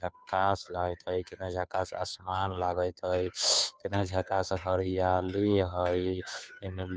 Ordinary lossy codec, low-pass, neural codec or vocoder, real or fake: none; none; codec, 16 kHz, 2 kbps, FunCodec, trained on Chinese and English, 25 frames a second; fake